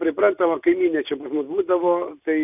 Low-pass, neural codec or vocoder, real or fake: 3.6 kHz; none; real